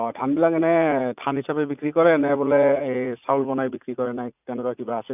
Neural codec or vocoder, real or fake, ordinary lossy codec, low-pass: vocoder, 44.1 kHz, 80 mel bands, Vocos; fake; none; 3.6 kHz